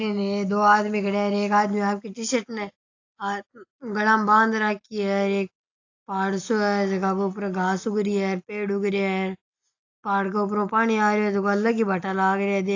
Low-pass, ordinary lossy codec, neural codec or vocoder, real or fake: 7.2 kHz; AAC, 48 kbps; none; real